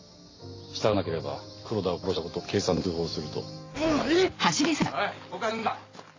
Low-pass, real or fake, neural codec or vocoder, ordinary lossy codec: 7.2 kHz; real; none; AAC, 32 kbps